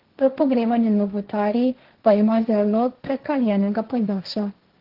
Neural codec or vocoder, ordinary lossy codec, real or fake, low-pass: codec, 16 kHz, 1.1 kbps, Voila-Tokenizer; Opus, 16 kbps; fake; 5.4 kHz